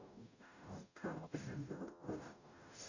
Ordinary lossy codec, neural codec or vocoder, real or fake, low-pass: none; codec, 44.1 kHz, 0.9 kbps, DAC; fake; 7.2 kHz